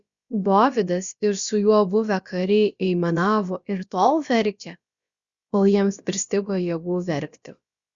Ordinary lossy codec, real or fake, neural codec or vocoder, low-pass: Opus, 64 kbps; fake; codec, 16 kHz, about 1 kbps, DyCAST, with the encoder's durations; 7.2 kHz